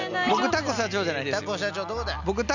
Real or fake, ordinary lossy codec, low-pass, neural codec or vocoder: real; none; 7.2 kHz; none